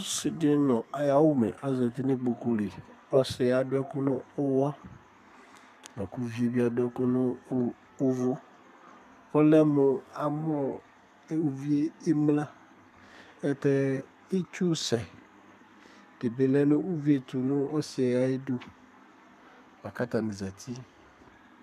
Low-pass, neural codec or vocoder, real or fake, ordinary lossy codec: 14.4 kHz; codec, 32 kHz, 1.9 kbps, SNAC; fake; MP3, 96 kbps